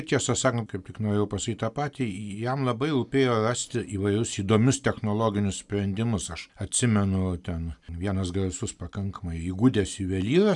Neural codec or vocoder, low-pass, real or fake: none; 10.8 kHz; real